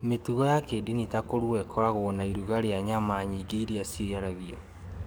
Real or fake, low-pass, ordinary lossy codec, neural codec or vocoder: fake; none; none; codec, 44.1 kHz, 7.8 kbps, DAC